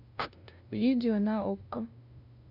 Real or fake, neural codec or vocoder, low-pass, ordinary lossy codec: fake; codec, 16 kHz, 0.5 kbps, FunCodec, trained on LibriTTS, 25 frames a second; 5.4 kHz; AAC, 48 kbps